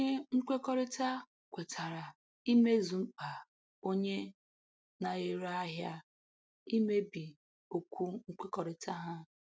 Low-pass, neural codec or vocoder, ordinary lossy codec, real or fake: none; none; none; real